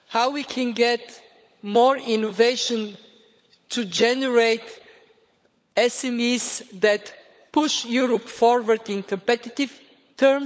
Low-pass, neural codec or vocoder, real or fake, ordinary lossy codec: none; codec, 16 kHz, 16 kbps, FunCodec, trained on LibriTTS, 50 frames a second; fake; none